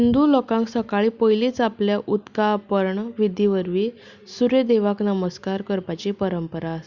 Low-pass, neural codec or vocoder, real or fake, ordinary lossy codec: 7.2 kHz; none; real; Opus, 64 kbps